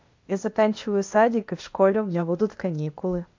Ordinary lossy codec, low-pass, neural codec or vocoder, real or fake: MP3, 48 kbps; 7.2 kHz; codec, 16 kHz, 0.8 kbps, ZipCodec; fake